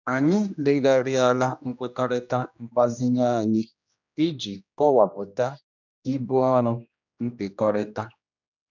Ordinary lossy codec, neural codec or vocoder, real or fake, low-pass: none; codec, 16 kHz, 1 kbps, X-Codec, HuBERT features, trained on general audio; fake; 7.2 kHz